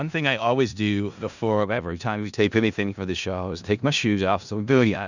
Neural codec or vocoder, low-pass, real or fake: codec, 16 kHz in and 24 kHz out, 0.4 kbps, LongCat-Audio-Codec, four codebook decoder; 7.2 kHz; fake